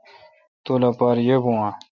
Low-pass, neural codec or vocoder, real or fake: 7.2 kHz; none; real